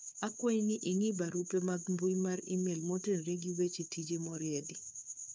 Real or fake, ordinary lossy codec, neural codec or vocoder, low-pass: fake; none; codec, 16 kHz, 4 kbps, FunCodec, trained on Chinese and English, 50 frames a second; none